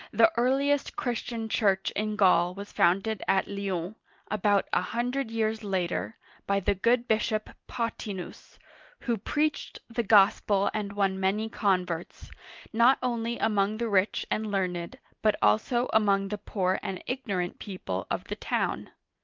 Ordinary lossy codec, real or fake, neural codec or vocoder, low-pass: Opus, 32 kbps; real; none; 7.2 kHz